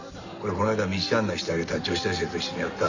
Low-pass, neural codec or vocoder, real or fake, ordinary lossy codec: 7.2 kHz; none; real; none